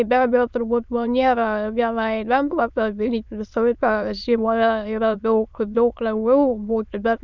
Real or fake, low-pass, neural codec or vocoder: fake; 7.2 kHz; autoencoder, 22.05 kHz, a latent of 192 numbers a frame, VITS, trained on many speakers